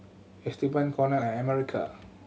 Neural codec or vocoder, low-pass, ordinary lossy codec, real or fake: none; none; none; real